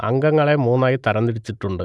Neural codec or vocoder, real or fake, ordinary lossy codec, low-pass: none; real; none; none